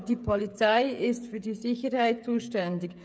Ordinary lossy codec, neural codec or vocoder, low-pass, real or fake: none; codec, 16 kHz, 16 kbps, FreqCodec, smaller model; none; fake